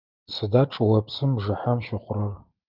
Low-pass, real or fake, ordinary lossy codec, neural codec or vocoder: 5.4 kHz; real; Opus, 24 kbps; none